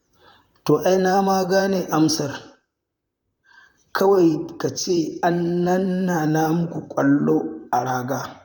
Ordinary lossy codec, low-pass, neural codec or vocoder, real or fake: none; 19.8 kHz; vocoder, 44.1 kHz, 128 mel bands, Pupu-Vocoder; fake